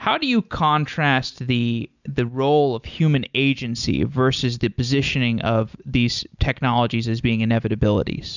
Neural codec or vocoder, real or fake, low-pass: none; real; 7.2 kHz